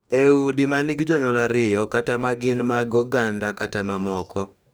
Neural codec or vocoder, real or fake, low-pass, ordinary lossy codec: codec, 44.1 kHz, 2.6 kbps, DAC; fake; none; none